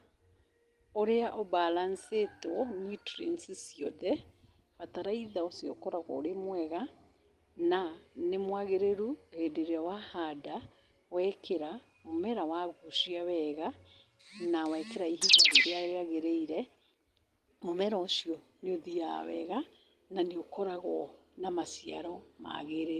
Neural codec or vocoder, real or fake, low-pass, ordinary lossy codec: none; real; 14.4 kHz; Opus, 24 kbps